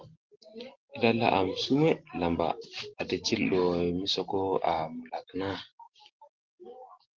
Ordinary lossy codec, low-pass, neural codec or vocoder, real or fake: Opus, 16 kbps; 7.2 kHz; none; real